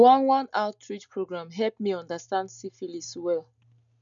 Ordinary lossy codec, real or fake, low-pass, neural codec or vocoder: none; real; 7.2 kHz; none